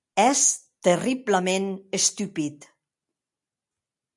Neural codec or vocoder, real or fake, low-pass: none; real; 10.8 kHz